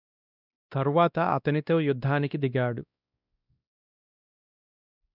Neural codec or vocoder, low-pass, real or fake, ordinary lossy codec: codec, 16 kHz, 1 kbps, X-Codec, WavLM features, trained on Multilingual LibriSpeech; 5.4 kHz; fake; none